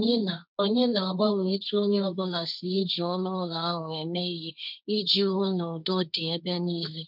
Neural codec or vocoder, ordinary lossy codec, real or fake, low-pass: codec, 16 kHz, 1.1 kbps, Voila-Tokenizer; none; fake; 5.4 kHz